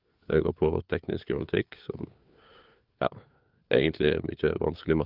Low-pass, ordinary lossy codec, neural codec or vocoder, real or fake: 5.4 kHz; Opus, 32 kbps; codec, 16 kHz, 4 kbps, FunCodec, trained on LibriTTS, 50 frames a second; fake